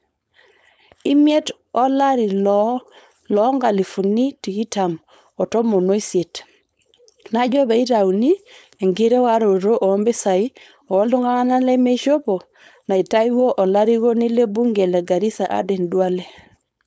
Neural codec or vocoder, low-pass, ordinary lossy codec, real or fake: codec, 16 kHz, 4.8 kbps, FACodec; none; none; fake